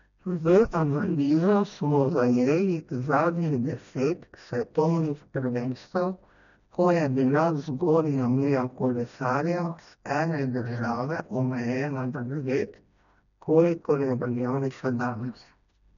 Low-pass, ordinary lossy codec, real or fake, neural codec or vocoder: 7.2 kHz; none; fake; codec, 16 kHz, 1 kbps, FreqCodec, smaller model